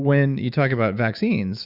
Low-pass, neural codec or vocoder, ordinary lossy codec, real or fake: 5.4 kHz; autoencoder, 48 kHz, 128 numbers a frame, DAC-VAE, trained on Japanese speech; Opus, 64 kbps; fake